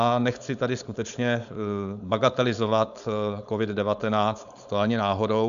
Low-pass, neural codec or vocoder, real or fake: 7.2 kHz; codec, 16 kHz, 4.8 kbps, FACodec; fake